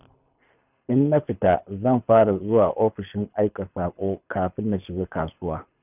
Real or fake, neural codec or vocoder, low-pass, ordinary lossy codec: fake; codec, 24 kHz, 6 kbps, HILCodec; 3.6 kHz; none